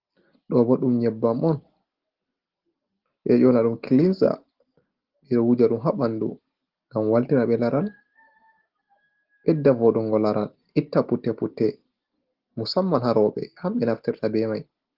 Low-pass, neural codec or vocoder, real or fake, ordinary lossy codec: 5.4 kHz; none; real; Opus, 16 kbps